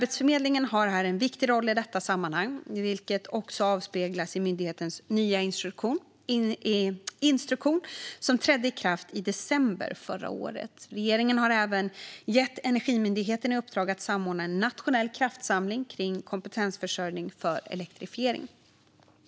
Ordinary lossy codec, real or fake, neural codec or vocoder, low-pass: none; real; none; none